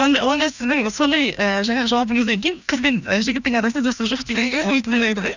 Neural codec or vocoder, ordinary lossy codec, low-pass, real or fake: codec, 16 kHz, 1 kbps, FreqCodec, larger model; none; 7.2 kHz; fake